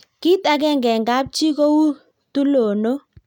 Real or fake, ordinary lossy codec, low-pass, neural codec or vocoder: real; none; 19.8 kHz; none